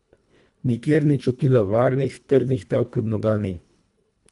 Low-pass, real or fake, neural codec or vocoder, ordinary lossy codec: 10.8 kHz; fake; codec, 24 kHz, 1.5 kbps, HILCodec; none